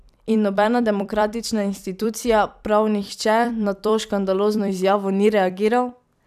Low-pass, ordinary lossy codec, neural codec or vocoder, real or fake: 14.4 kHz; none; vocoder, 44.1 kHz, 128 mel bands every 256 samples, BigVGAN v2; fake